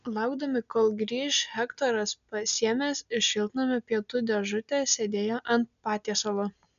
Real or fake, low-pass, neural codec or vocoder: real; 7.2 kHz; none